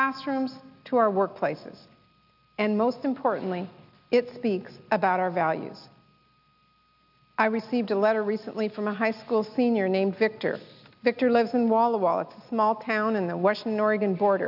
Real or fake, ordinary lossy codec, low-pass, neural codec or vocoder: real; AAC, 48 kbps; 5.4 kHz; none